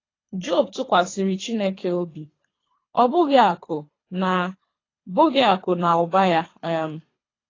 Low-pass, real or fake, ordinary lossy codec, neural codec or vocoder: 7.2 kHz; fake; AAC, 32 kbps; codec, 24 kHz, 3 kbps, HILCodec